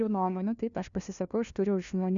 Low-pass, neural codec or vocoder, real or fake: 7.2 kHz; codec, 16 kHz, 1 kbps, FunCodec, trained on LibriTTS, 50 frames a second; fake